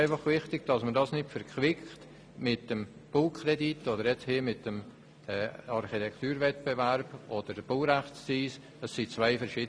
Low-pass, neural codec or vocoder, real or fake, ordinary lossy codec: none; none; real; none